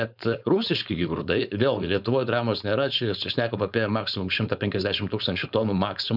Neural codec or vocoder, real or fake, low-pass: codec, 16 kHz, 4.8 kbps, FACodec; fake; 5.4 kHz